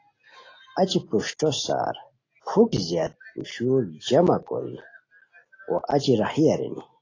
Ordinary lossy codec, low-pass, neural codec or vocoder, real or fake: AAC, 32 kbps; 7.2 kHz; none; real